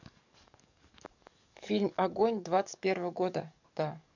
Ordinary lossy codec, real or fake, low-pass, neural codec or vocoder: none; fake; 7.2 kHz; codec, 44.1 kHz, 7.8 kbps, DAC